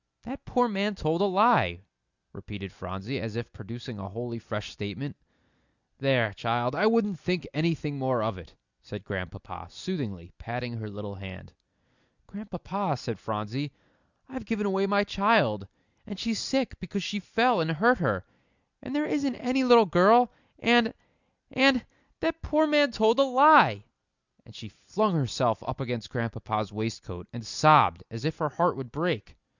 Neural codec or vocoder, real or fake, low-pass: none; real; 7.2 kHz